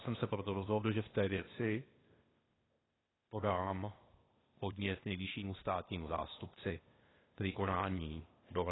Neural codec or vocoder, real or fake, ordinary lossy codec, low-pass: codec, 16 kHz, 0.8 kbps, ZipCodec; fake; AAC, 16 kbps; 7.2 kHz